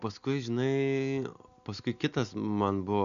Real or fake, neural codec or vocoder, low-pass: real; none; 7.2 kHz